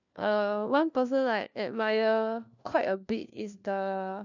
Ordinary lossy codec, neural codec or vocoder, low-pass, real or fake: none; codec, 16 kHz, 1 kbps, FunCodec, trained on LibriTTS, 50 frames a second; 7.2 kHz; fake